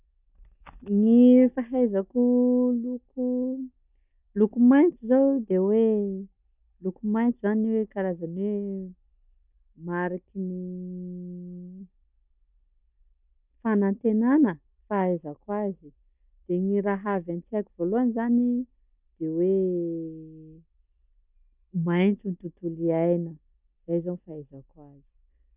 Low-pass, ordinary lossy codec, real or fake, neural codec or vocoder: 3.6 kHz; none; real; none